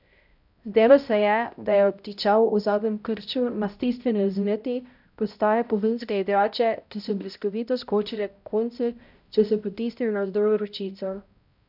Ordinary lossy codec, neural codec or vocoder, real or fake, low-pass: none; codec, 16 kHz, 0.5 kbps, X-Codec, HuBERT features, trained on balanced general audio; fake; 5.4 kHz